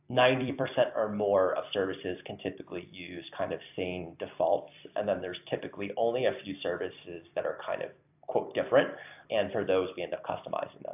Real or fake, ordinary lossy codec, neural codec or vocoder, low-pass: fake; AAC, 32 kbps; vocoder, 44.1 kHz, 128 mel bands every 256 samples, BigVGAN v2; 3.6 kHz